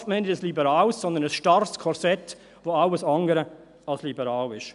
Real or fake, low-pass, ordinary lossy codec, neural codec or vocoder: real; 10.8 kHz; none; none